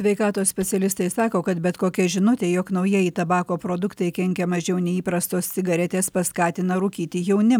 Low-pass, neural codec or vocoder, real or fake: 19.8 kHz; none; real